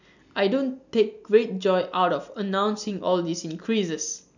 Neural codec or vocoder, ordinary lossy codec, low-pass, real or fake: none; none; 7.2 kHz; real